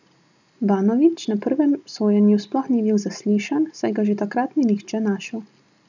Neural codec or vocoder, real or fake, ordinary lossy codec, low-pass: none; real; none; 7.2 kHz